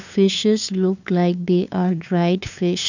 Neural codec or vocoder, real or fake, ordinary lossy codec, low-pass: codec, 16 kHz, 2 kbps, FunCodec, trained on LibriTTS, 25 frames a second; fake; none; 7.2 kHz